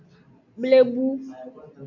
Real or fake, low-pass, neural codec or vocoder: real; 7.2 kHz; none